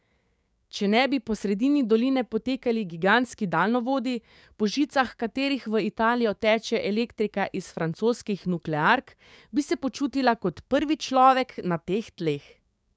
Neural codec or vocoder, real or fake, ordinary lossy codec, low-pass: codec, 16 kHz, 6 kbps, DAC; fake; none; none